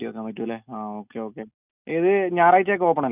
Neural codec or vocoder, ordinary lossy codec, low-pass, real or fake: none; none; 3.6 kHz; real